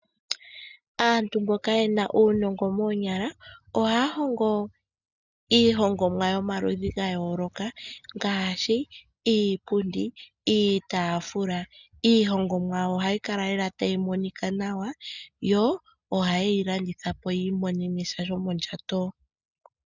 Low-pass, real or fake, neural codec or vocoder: 7.2 kHz; real; none